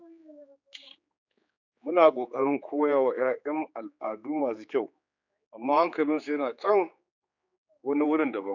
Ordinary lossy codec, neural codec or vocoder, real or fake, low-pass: none; codec, 16 kHz, 4 kbps, X-Codec, HuBERT features, trained on general audio; fake; 7.2 kHz